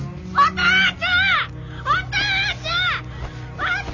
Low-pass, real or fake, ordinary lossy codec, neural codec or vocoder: 7.2 kHz; real; none; none